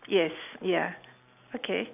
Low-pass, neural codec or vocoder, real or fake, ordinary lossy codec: 3.6 kHz; none; real; none